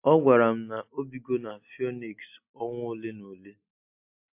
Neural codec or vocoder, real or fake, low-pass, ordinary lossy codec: none; real; 3.6 kHz; MP3, 32 kbps